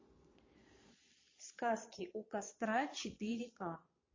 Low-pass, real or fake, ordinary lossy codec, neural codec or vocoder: 7.2 kHz; fake; MP3, 32 kbps; vocoder, 22.05 kHz, 80 mel bands, Vocos